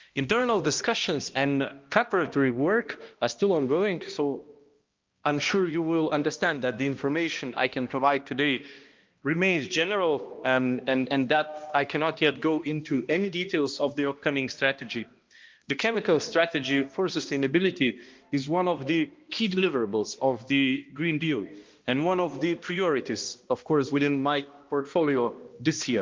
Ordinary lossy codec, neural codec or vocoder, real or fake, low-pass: Opus, 32 kbps; codec, 16 kHz, 1 kbps, X-Codec, HuBERT features, trained on balanced general audio; fake; 7.2 kHz